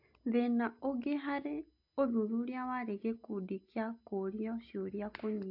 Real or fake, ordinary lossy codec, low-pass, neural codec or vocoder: real; AAC, 48 kbps; 5.4 kHz; none